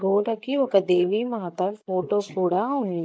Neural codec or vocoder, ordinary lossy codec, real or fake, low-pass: codec, 16 kHz, 16 kbps, FreqCodec, larger model; none; fake; none